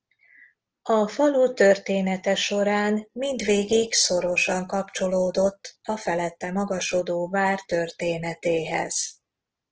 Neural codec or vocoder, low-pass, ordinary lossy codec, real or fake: none; 7.2 kHz; Opus, 16 kbps; real